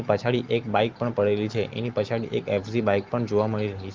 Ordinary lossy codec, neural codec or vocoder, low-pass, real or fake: Opus, 24 kbps; none; 7.2 kHz; real